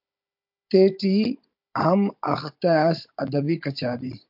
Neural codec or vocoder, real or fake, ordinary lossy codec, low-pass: codec, 16 kHz, 16 kbps, FunCodec, trained on Chinese and English, 50 frames a second; fake; MP3, 48 kbps; 5.4 kHz